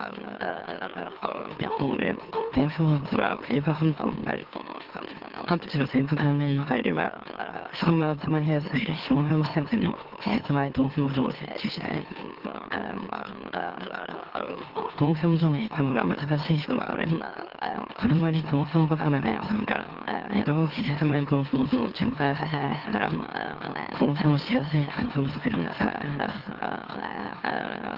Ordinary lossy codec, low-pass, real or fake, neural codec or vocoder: Opus, 16 kbps; 5.4 kHz; fake; autoencoder, 44.1 kHz, a latent of 192 numbers a frame, MeloTTS